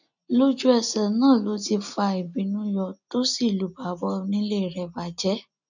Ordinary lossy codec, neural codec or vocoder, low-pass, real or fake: none; none; 7.2 kHz; real